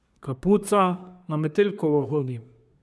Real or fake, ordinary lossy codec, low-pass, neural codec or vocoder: fake; none; none; codec, 24 kHz, 1 kbps, SNAC